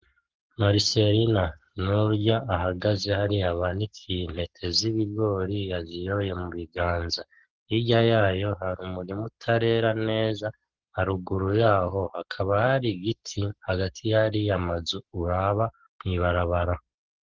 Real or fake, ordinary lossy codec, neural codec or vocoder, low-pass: fake; Opus, 16 kbps; codec, 44.1 kHz, 7.8 kbps, Pupu-Codec; 7.2 kHz